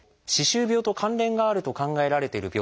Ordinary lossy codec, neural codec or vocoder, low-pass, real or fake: none; none; none; real